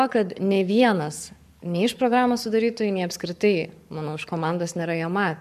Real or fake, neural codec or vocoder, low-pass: fake; codec, 44.1 kHz, 7.8 kbps, Pupu-Codec; 14.4 kHz